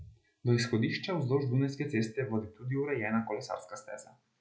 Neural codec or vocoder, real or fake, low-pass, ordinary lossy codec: none; real; none; none